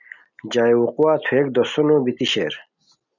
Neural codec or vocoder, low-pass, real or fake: none; 7.2 kHz; real